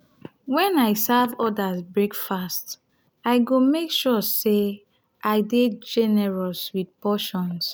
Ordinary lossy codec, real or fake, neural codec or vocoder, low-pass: none; real; none; none